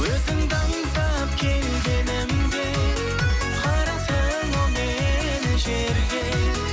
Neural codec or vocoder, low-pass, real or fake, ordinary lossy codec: none; none; real; none